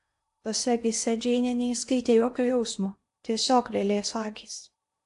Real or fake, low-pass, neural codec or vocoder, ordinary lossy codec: fake; 10.8 kHz; codec, 16 kHz in and 24 kHz out, 0.8 kbps, FocalCodec, streaming, 65536 codes; AAC, 64 kbps